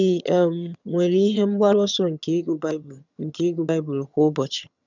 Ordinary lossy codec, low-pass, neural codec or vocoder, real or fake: none; 7.2 kHz; vocoder, 22.05 kHz, 80 mel bands, HiFi-GAN; fake